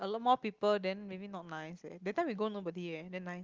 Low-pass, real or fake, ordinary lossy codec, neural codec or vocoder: 7.2 kHz; real; Opus, 32 kbps; none